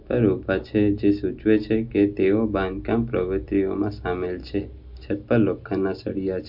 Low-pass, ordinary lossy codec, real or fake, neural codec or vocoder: 5.4 kHz; MP3, 48 kbps; real; none